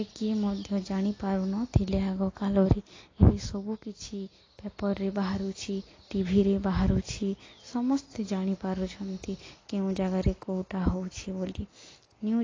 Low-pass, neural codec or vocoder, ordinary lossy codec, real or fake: 7.2 kHz; none; AAC, 32 kbps; real